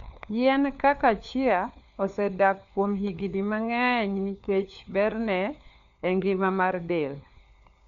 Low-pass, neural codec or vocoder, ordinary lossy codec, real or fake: 7.2 kHz; codec, 16 kHz, 4 kbps, FunCodec, trained on LibriTTS, 50 frames a second; none; fake